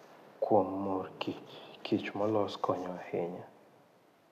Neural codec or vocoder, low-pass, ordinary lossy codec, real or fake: none; 14.4 kHz; none; real